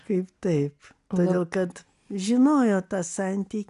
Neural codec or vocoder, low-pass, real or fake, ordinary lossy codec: none; 10.8 kHz; real; MP3, 96 kbps